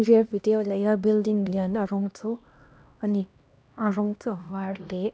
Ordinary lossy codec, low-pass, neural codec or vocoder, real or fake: none; none; codec, 16 kHz, 1 kbps, X-Codec, HuBERT features, trained on LibriSpeech; fake